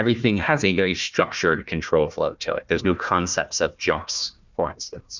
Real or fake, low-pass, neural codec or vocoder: fake; 7.2 kHz; codec, 16 kHz, 1 kbps, FunCodec, trained on Chinese and English, 50 frames a second